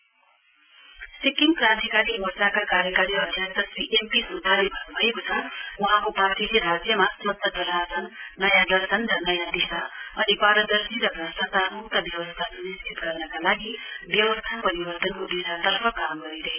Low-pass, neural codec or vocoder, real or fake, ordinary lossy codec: 3.6 kHz; none; real; none